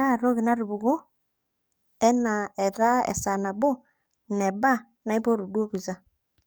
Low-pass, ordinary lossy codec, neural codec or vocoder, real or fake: none; none; codec, 44.1 kHz, 7.8 kbps, DAC; fake